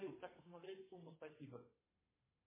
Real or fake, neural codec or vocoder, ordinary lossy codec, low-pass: fake; codec, 32 kHz, 1.9 kbps, SNAC; MP3, 16 kbps; 3.6 kHz